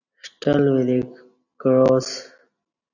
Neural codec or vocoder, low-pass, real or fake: none; 7.2 kHz; real